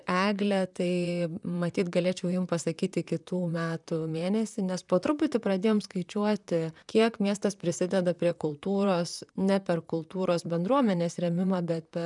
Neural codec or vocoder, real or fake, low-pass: vocoder, 44.1 kHz, 128 mel bands, Pupu-Vocoder; fake; 10.8 kHz